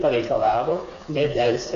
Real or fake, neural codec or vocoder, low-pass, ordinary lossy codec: fake; codec, 16 kHz, 4 kbps, FreqCodec, smaller model; 7.2 kHz; AAC, 48 kbps